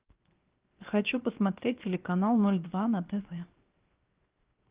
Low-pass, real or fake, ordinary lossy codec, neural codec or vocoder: 3.6 kHz; fake; Opus, 32 kbps; codec, 16 kHz, 0.7 kbps, FocalCodec